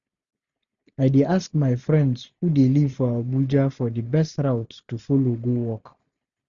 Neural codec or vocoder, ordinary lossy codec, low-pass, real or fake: none; none; 7.2 kHz; real